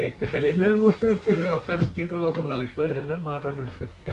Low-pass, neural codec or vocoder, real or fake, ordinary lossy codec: 10.8 kHz; codec, 24 kHz, 1 kbps, SNAC; fake; none